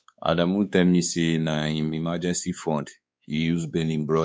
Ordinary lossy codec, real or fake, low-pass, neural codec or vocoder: none; fake; none; codec, 16 kHz, 4 kbps, X-Codec, WavLM features, trained on Multilingual LibriSpeech